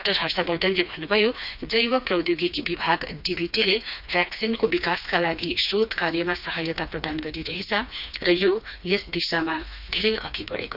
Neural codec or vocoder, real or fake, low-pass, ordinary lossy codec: codec, 16 kHz, 2 kbps, FreqCodec, smaller model; fake; 5.4 kHz; none